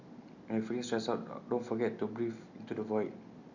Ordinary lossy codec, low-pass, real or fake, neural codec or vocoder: Opus, 64 kbps; 7.2 kHz; real; none